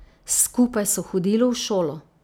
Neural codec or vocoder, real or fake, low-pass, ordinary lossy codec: none; real; none; none